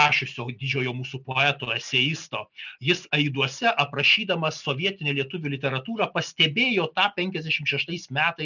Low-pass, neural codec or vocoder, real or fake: 7.2 kHz; none; real